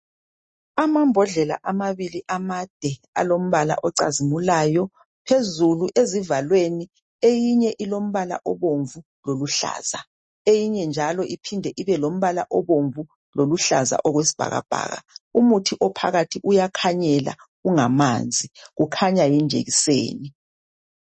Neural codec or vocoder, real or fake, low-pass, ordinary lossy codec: none; real; 10.8 kHz; MP3, 32 kbps